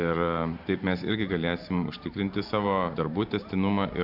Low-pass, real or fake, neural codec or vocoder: 5.4 kHz; fake; vocoder, 44.1 kHz, 128 mel bands every 512 samples, BigVGAN v2